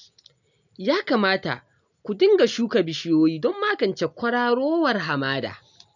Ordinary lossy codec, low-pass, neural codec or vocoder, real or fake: none; 7.2 kHz; none; real